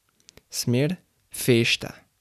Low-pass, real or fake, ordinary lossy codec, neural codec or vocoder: 14.4 kHz; real; none; none